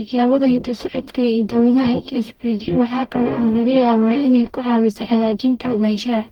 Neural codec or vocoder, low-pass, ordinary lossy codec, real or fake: codec, 44.1 kHz, 0.9 kbps, DAC; 19.8 kHz; Opus, 32 kbps; fake